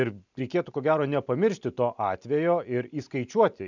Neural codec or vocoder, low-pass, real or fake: none; 7.2 kHz; real